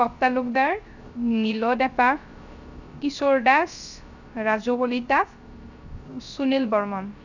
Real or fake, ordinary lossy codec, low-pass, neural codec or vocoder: fake; none; 7.2 kHz; codec, 16 kHz, 0.3 kbps, FocalCodec